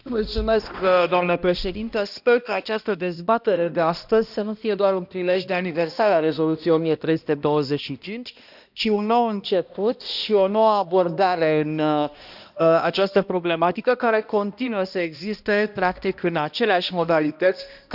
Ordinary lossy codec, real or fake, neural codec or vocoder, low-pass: none; fake; codec, 16 kHz, 1 kbps, X-Codec, HuBERT features, trained on balanced general audio; 5.4 kHz